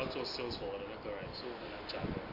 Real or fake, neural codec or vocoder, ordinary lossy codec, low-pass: real; none; AAC, 32 kbps; 5.4 kHz